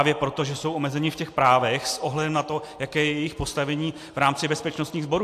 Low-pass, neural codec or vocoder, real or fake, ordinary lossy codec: 14.4 kHz; none; real; AAC, 64 kbps